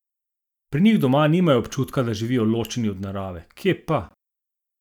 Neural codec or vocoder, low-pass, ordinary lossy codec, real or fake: none; 19.8 kHz; none; real